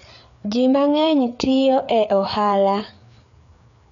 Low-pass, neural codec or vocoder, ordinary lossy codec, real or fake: 7.2 kHz; codec, 16 kHz, 4 kbps, FreqCodec, larger model; none; fake